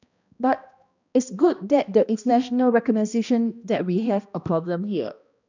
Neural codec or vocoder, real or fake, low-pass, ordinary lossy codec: codec, 16 kHz, 1 kbps, X-Codec, HuBERT features, trained on balanced general audio; fake; 7.2 kHz; none